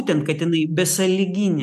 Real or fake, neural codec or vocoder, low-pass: real; none; 14.4 kHz